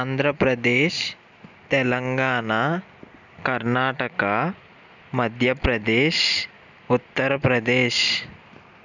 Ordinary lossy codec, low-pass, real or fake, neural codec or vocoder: none; 7.2 kHz; real; none